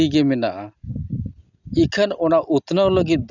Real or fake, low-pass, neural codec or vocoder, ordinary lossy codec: real; 7.2 kHz; none; none